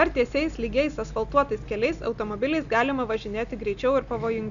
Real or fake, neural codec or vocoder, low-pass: real; none; 7.2 kHz